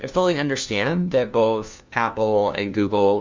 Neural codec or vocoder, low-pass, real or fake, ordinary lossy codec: codec, 16 kHz, 1 kbps, FunCodec, trained on LibriTTS, 50 frames a second; 7.2 kHz; fake; MP3, 64 kbps